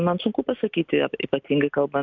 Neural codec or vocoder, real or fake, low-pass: none; real; 7.2 kHz